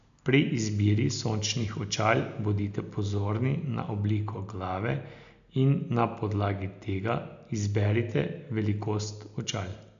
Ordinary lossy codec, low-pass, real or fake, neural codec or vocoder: none; 7.2 kHz; real; none